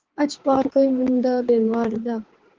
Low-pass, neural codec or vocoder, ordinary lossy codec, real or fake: 7.2 kHz; codec, 16 kHz, 4 kbps, X-Codec, HuBERT features, trained on balanced general audio; Opus, 16 kbps; fake